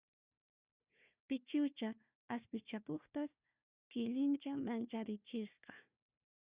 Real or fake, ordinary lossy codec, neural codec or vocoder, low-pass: fake; Opus, 64 kbps; codec, 16 kHz, 1 kbps, FunCodec, trained on Chinese and English, 50 frames a second; 3.6 kHz